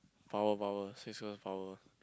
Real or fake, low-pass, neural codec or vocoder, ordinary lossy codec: real; none; none; none